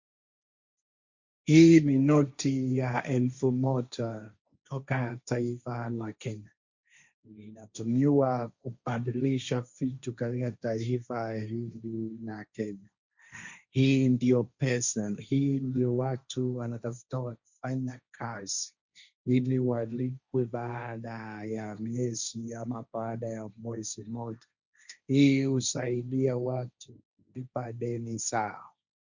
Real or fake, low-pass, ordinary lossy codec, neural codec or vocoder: fake; 7.2 kHz; Opus, 64 kbps; codec, 16 kHz, 1.1 kbps, Voila-Tokenizer